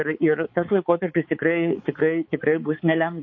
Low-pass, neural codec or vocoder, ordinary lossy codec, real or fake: 7.2 kHz; codec, 16 kHz, 4 kbps, X-Codec, HuBERT features, trained on balanced general audio; MP3, 32 kbps; fake